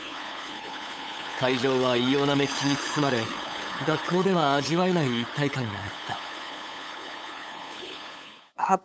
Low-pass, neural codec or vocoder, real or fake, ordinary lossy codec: none; codec, 16 kHz, 8 kbps, FunCodec, trained on LibriTTS, 25 frames a second; fake; none